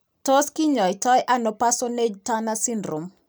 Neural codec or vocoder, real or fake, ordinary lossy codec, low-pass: none; real; none; none